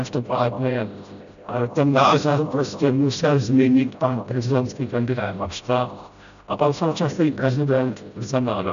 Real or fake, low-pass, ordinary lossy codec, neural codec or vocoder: fake; 7.2 kHz; MP3, 96 kbps; codec, 16 kHz, 0.5 kbps, FreqCodec, smaller model